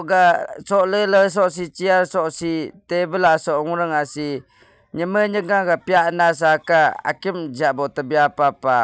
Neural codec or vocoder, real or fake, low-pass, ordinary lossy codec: none; real; none; none